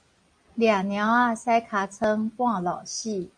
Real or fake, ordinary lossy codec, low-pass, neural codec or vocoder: real; MP3, 48 kbps; 9.9 kHz; none